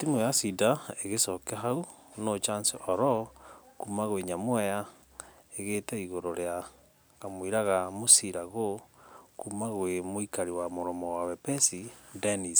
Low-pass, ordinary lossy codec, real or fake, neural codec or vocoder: none; none; real; none